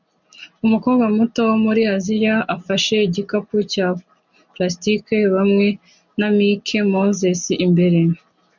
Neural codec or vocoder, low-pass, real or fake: none; 7.2 kHz; real